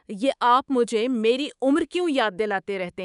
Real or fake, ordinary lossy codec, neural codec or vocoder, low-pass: fake; none; codec, 24 kHz, 3.1 kbps, DualCodec; 10.8 kHz